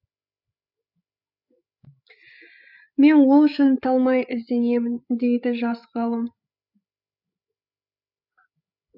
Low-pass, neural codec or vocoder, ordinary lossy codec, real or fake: 5.4 kHz; codec, 16 kHz, 8 kbps, FreqCodec, larger model; none; fake